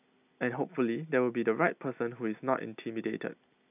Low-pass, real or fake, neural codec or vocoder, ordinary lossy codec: 3.6 kHz; real; none; none